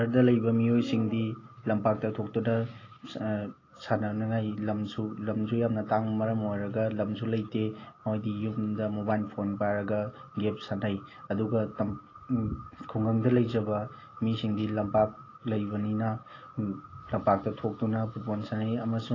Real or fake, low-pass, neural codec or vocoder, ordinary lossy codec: real; 7.2 kHz; none; AAC, 32 kbps